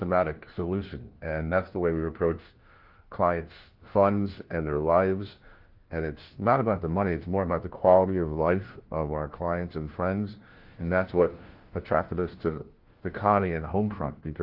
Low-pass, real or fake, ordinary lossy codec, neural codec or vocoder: 5.4 kHz; fake; Opus, 16 kbps; codec, 16 kHz, 1 kbps, FunCodec, trained on LibriTTS, 50 frames a second